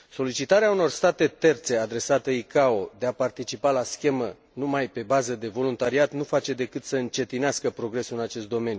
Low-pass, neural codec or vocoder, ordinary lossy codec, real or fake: none; none; none; real